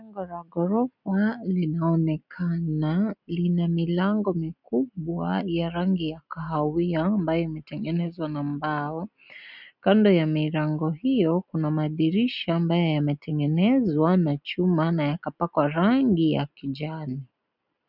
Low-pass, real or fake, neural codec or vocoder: 5.4 kHz; real; none